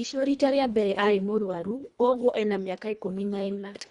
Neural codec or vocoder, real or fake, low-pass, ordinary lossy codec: codec, 24 kHz, 1.5 kbps, HILCodec; fake; 10.8 kHz; Opus, 64 kbps